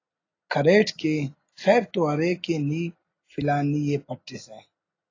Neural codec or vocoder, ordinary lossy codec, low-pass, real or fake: none; AAC, 32 kbps; 7.2 kHz; real